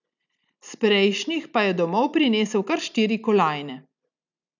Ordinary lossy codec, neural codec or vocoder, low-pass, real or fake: none; none; 7.2 kHz; real